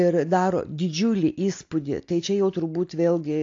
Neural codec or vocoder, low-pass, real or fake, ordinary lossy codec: none; 7.2 kHz; real; AAC, 48 kbps